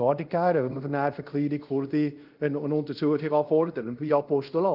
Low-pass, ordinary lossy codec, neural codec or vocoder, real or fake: 5.4 kHz; Opus, 32 kbps; codec, 24 kHz, 0.5 kbps, DualCodec; fake